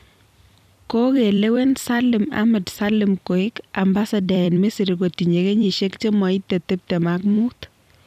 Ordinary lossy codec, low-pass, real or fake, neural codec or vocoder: none; 14.4 kHz; fake; vocoder, 44.1 kHz, 128 mel bands every 256 samples, BigVGAN v2